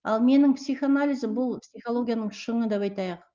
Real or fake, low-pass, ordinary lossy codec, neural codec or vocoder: real; 7.2 kHz; Opus, 32 kbps; none